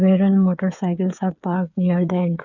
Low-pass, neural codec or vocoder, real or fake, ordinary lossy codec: 7.2 kHz; codec, 16 kHz, 8 kbps, FreqCodec, smaller model; fake; none